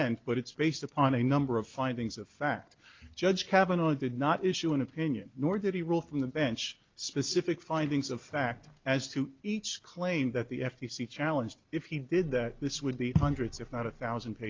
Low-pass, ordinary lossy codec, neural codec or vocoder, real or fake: 7.2 kHz; Opus, 24 kbps; none; real